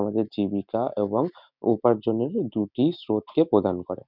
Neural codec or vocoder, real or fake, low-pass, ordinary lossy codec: none; real; 5.4 kHz; none